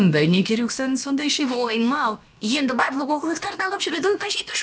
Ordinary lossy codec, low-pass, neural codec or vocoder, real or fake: none; none; codec, 16 kHz, about 1 kbps, DyCAST, with the encoder's durations; fake